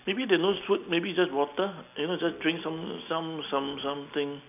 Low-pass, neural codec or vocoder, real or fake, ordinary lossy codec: 3.6 kHz; none; real; none